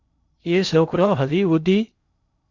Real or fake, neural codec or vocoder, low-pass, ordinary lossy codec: fake; codec, 16 kHz in and 24 kHz out, 0.8 kbps, FocalCodec, streaming, 65536 codes; 7.2 kHz; Opus, 64 kbps